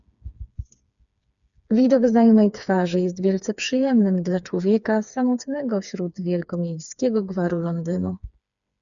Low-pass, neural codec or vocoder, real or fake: 7.2 kHz; codec, 16 kHz, 4 kbps, FreqCodec, smaller model; fake